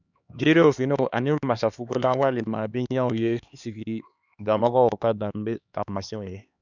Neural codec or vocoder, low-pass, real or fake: codec, 16 kHz, 2 kbps, X-Codec, HuBERT features, trained on LibriSpeech; 7.2 kHz; fake